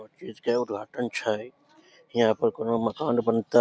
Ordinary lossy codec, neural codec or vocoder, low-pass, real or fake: none; none; none; real